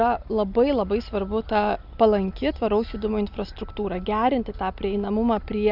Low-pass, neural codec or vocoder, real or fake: 5.4 kHz; none; real